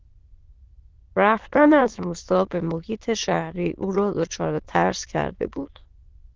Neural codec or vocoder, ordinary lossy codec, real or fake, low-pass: autoencoder, 22.05 kHz, a latent of 192 numbers a frame, VITS, trained on many speakers; Opus, 16 kbps; fake; 7.2 kHz